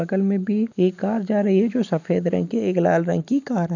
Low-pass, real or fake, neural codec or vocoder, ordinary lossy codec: 7.2 kHz; real; none; none